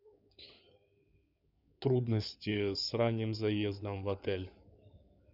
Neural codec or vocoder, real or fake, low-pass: codec, 16 kHz in and 24 kHz out, 2.2 kbps, FireRedTTS-2 codec; fake; 5.4 kHz